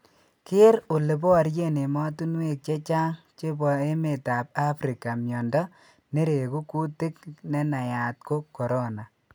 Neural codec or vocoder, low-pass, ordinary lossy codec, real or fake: none; none; none; real